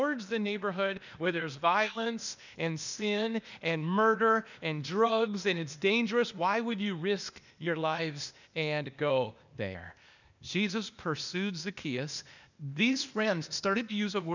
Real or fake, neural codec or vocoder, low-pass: fake; codec, 16 kHz, 0.8 kbps, ZipCodec; 7.2 kHz